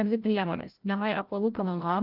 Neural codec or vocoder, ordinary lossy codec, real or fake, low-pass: codec, 16 kHz, 0.5 kbps, FreqCodec, larger model; Opus, 32 kbps; fake; 5.4 kHz